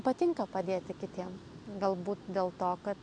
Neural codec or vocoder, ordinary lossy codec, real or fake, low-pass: none; AAC, 64 kbps; real; 9.9 kHz